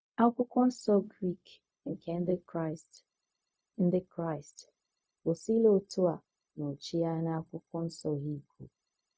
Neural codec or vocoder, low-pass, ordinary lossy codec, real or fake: codec, 16 kHz, 0.4 kbps, LongCat-Audio-Codec; none; none; fake